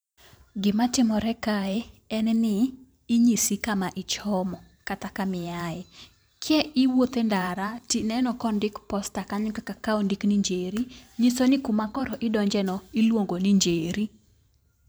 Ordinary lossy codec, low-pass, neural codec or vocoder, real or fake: none; none; vocoder, 44.1 kHz, 128 mel bands every 512 samples, BigVGAN v2; fake